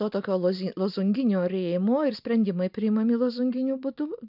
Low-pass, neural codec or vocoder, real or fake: 5.4 kHz; none; real